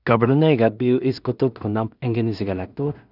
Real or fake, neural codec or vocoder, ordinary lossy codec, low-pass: fake; codec, 16 kHz in and 24 kHz out, 0.4 kbps, LongCat-Audio-Codec, two codebook decoder; none; 5.4 kHz